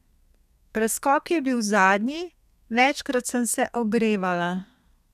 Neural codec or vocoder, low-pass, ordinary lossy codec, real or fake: codec, 32 kHz, 1.9 kbps, SNAC; 14.4 kHz; none; fake